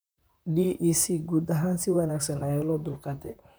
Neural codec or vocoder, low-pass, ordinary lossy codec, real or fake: vocoder, 44.1 kHz, 128 mel bands, Pupu-Vocoder; none; none; fake